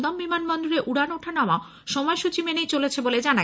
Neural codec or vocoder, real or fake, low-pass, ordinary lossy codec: none; real; none; none